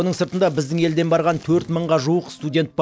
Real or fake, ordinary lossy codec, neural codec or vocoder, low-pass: real; none; none; none